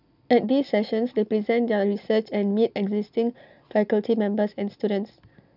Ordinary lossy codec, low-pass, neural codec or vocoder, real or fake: none; 5.4 kHz; none; real